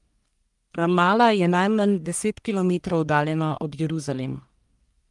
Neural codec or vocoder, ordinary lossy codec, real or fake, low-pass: codec, 44.1 kHz, 1.7 kbps, Pupu-Codec; Opus, 32 kbps; fake; 10.8 kHz